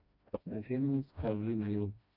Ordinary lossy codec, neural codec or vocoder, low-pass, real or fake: none; codec, 16 kHz, 1 kbps, FreqCodec, smaller model; 5.4 kHz; fake